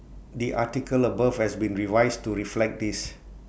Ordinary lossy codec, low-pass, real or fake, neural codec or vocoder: none; none; real; none